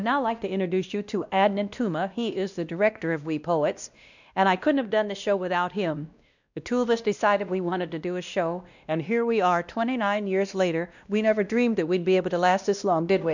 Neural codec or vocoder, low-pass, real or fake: codec, 16 kHz, 1 kbps, X-Codec, WavLM features, trained on Multilingual LibriSpeech; 7.2 kHz; fake